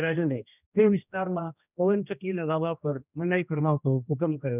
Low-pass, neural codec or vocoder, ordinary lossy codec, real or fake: 3.6 kHz; codec, 16 kHz, 1 kbps, X-Codec, HuBERT features, trained on general audio; none; fake